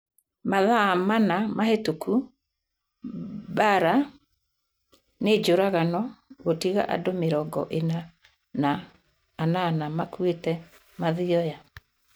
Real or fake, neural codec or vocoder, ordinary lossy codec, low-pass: real; none; none; none